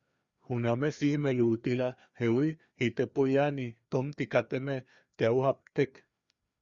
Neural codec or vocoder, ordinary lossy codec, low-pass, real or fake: codec, 16 kHz, 2 kbps, FreqCodec, larger model; Opus, 64 kbps; 7.2 kHz; fake